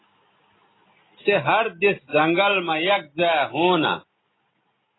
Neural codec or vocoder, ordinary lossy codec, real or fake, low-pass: none; AAC, 16 kbps; real; 7.2 kHz